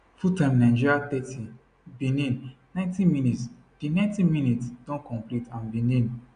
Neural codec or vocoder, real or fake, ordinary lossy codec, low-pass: none; real; none; 9.9 kHz